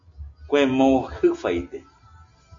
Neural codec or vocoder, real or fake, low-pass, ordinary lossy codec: none; real; 7.2 kHz; AAC, 48 kbps